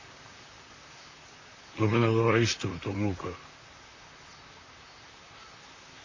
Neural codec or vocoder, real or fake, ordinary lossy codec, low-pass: vocoder, 44.1 kHz, 128 mel bands, Pupu-Vocoder; fake; Opus, 64 kbps; 7.2 kHz